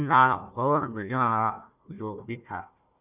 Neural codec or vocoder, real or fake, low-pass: codec, 16 kHz, 1 kbps, FunCodec, trained on Chinese and English, 50 frames a second; fake; 3.6 kHz